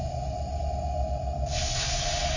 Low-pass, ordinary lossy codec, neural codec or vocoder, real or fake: 7.2 kHz; none; none; real